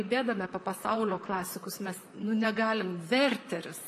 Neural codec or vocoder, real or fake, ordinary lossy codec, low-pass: vocoder, 44.1 kHz, 128 mel bands, Pupu-Vocoder; fake; MP3, 96 kbps; 14.4 kHz